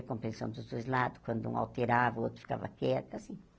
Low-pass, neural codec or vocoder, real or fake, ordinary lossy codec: none; none; real; none